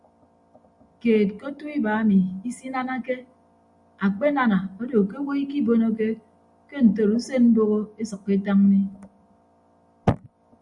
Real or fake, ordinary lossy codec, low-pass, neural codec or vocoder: real; Opus, 64 kbps; 10.8 kHz; none